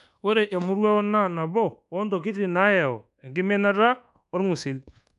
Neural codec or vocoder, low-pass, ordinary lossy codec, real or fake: codec, 24 kHz, 1.2 kbps, DualCodec; 10.8 kHz; none; fake